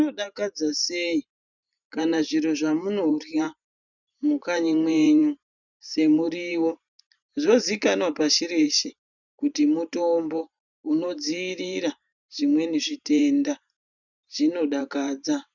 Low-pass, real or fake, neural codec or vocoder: 7.2 kHz; fake; vocoder, 44.1 kHz, 128 mel bands every 512 samples, BigVGAN v2